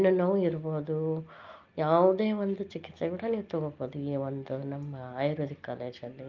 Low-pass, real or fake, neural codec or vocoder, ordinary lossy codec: 7.2 kHz; real; none; Opus, 24 kbps